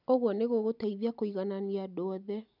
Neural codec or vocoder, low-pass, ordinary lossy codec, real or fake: none; 5.4 kHz; none; real